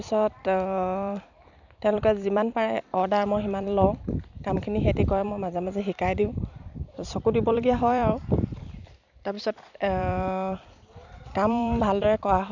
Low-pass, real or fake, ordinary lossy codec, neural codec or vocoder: 7.2 kHz; fake; none; vocoder, 44.1 kHz, 128 mel bands every 256 samples, BigVGAN v2